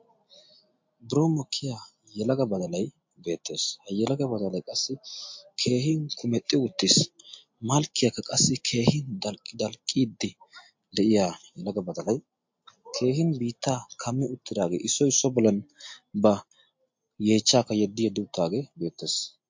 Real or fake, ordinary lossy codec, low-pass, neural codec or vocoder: real; MP3, 48 kbps; 7.2 kHz; none